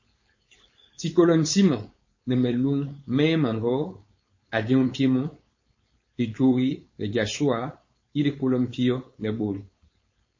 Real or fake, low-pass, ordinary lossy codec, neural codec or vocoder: fake; 7.2 kHz; MP3, 32 kbps; codec, 16 kHz, 4.8 kbps, FACodec